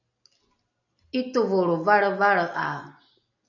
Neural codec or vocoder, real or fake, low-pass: none; real; 7.2 kHz